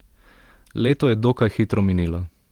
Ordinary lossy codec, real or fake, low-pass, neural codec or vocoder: Opus, 24 kbps; fake; 19.8 kHz; vocoder, 48 kHz, 128 mel bands, Vocos